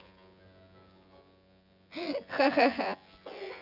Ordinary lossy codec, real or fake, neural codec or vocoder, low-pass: none; fake; vocoder, 24 kHz, 100 mel bands, Vocos; 5.4 kHz